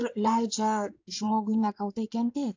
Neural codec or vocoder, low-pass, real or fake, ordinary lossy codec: codec, 16 kHz in and 24 kHz out, 2.2 kbps, FireRedTTS-2 codec; 7.2 kHz; fake; AAC, 48 kbps